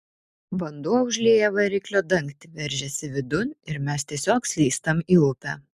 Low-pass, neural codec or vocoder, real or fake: 14.4 kHz; none; real